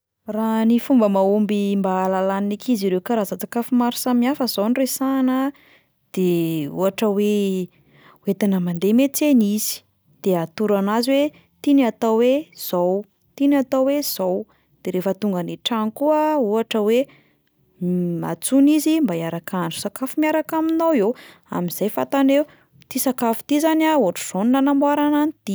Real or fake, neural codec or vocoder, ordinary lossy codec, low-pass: real; none; none; none